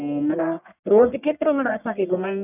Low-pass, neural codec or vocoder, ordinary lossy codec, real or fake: 3.6 kHz; codec, 44.1 kHz, 1.7 kbps, Pupu-Codec; none; fake